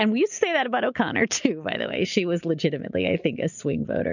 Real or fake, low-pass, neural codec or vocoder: real; 7.2 kHz; none